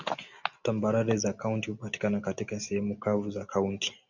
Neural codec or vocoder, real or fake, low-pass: none; real; 7.2 kHz